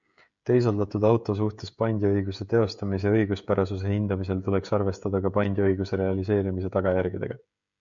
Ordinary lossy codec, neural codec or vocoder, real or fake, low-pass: MP3, 64 kbps; codec, 16 kHz, 16 kbps, FreqCodec, smaller model; fake; 7.2 kHz